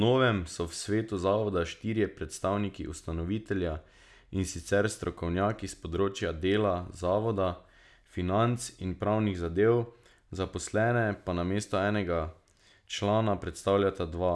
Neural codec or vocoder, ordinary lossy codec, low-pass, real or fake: none; none; none; real